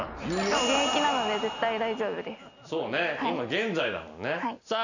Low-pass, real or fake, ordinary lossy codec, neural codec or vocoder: 7.2 kHz; real; none; none